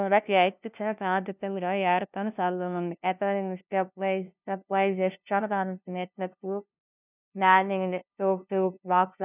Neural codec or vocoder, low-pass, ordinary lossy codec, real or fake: codec, 16 kHz, 0.5 kbps, FunCodec, trained on LibriTTS, 25 frames a second; 3.6 kHz; none; fake